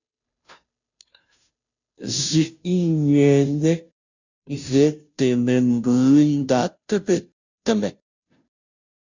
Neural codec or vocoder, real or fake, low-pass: codec, 16 kHz, 0.5 kbps, FunCodec, trained on Chinese and English, 25 frames a second; fake; 7.2 kHz